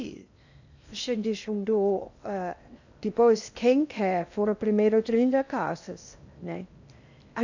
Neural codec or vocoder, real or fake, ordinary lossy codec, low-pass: codec, 16 kHz in and 24 kHz out, 0.6 kbps, FocalCodec, streaming, 2048 codes; fake; none; 7.2 kHz